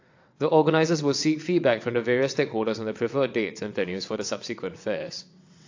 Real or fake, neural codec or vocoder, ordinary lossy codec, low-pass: fake; vocoder, 22.05 kHz, 80 mel bands, WaveNeXt; AAC, 48 kbps; 7.2 kHz